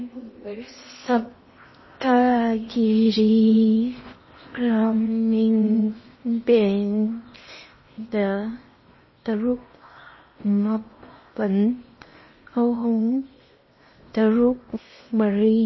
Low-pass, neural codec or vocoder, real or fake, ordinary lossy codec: 7.2 kHz; codec, 16 kHz in and 24 kHz out, 0.8 kbps, FocalCodec, streaming, 65536 codes; fake; MP3, 24 kbps